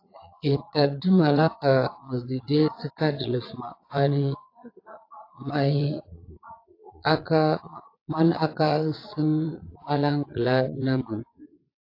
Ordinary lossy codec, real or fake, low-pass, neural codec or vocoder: AAC, 32 kbps; fake; 5.4 kHz; vocoder, 22.05 kHz, 80 mel bands, Vocos